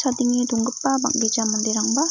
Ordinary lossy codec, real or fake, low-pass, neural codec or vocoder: none; real; 7.2 kHz; none